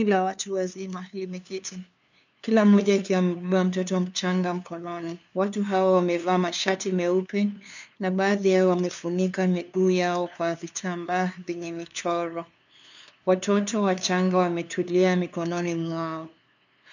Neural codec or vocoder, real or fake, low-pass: codec, 16 kHz, 2 kbps, FunCodec, trained on LibriTTS, 25 frames a second; fake; 7.2 kHz